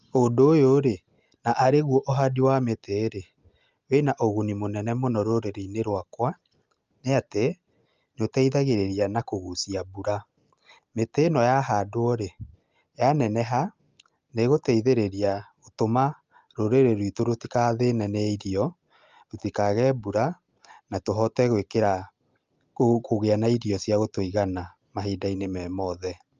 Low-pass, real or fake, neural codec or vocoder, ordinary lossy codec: 7.2 kHz; real; none; Opus, 24 kbps